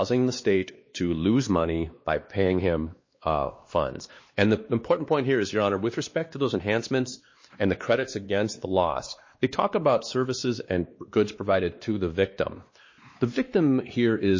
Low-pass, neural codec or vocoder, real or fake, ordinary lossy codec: 7.2 kHz; codec, 16 kHz, 2 kbps, X-Codec, WavLM features, trained on Multilingual LibriSpeech; fake; MP3, 32 kbps